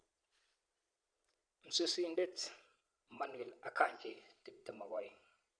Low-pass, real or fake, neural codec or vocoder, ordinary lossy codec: 9.9 kHz; fake; vocoder, 22.05 kHz, 80 mel bands, Vocos; none